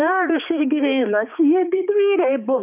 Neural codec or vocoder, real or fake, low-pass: codec, 16 kHz, 4 kbps, X-Codec, HuBERT features, trained on balanced general audio; fake; 3.6 kHz